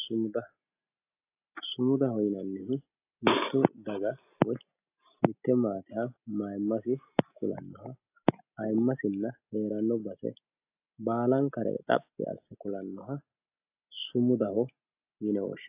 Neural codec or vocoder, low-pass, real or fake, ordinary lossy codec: none; 3.6 kHz; real; AAC, 32 kbps